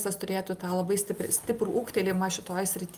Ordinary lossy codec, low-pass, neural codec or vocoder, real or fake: Opus, 32 kbps; 14.4 kHz; none; real